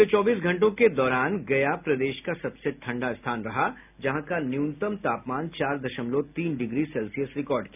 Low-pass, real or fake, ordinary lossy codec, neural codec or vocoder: 3.6 kHz; real; none; none